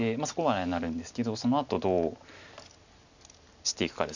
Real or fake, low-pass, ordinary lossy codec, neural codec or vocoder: real; 7.2 kHz; none; none